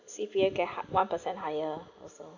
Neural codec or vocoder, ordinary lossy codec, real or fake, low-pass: none; none; real; 7.2 kHz